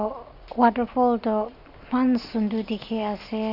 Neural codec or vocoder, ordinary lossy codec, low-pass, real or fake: none; none; 5.4 kHz; real